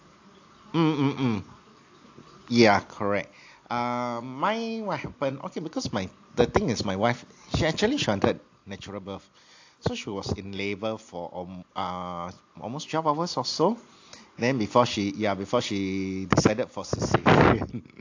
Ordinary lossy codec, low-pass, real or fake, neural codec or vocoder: AAC, 48 kbps; 7.2 kHz; real; none